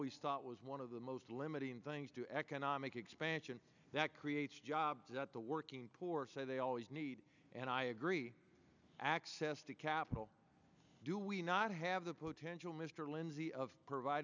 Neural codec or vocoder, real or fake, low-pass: none; real; 7.2 kHz